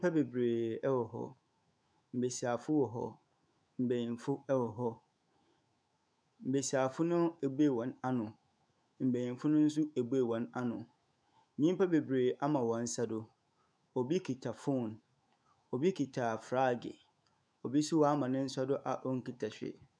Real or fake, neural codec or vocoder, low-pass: fake; autoencoder, 48 kHz, 128 numbers a frame, DAC-VAE, trained on Japanese speech; 9.9 kHz